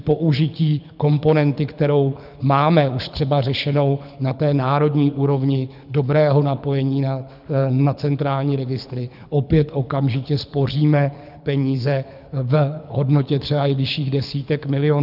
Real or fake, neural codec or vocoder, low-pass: fake; codec, 24 kHz, 6 kbps, HILCodec; 5.4 kHz